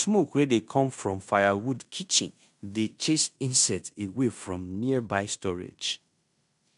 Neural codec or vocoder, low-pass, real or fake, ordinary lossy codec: codec, 16 kHz in and 24 kHz out, 0.9 kbps, LongCat-Audio-Codec, fine tuned four codebook decoder; 10.8 kHz; fake; none